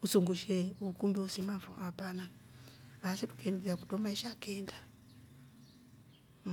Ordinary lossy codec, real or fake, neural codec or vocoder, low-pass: none; real; none; 19.8 kHz